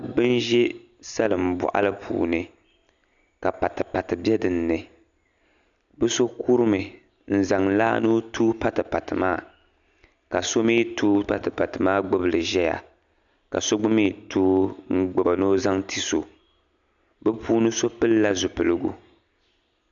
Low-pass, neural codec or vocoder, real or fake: 7.2 kHz; none; real